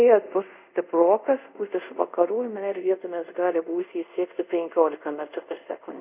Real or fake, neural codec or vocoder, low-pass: fake; codec, 24 kHz, 0.5 kbps, DualCodec; 3.6 kHz